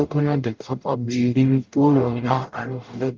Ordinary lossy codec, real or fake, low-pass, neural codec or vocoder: Opus, 32 kbps; fake; 7.2 kHz; codec, 44.1 kHz, 0.9 kbps, DAC